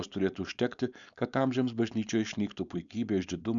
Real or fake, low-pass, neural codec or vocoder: fake; 7.2 kHz; codec, 16 kHz, 4.8 kbps, FACodec